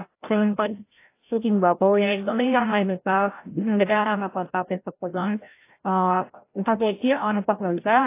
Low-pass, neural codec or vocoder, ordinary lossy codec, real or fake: 3.6 kHz; codec, 16 kHz, 0.5 kbps, FreqCodec, larger model; AAC, 24 kbps; fake